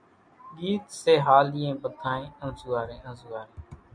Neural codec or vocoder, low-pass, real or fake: none; 9.9 kHz; real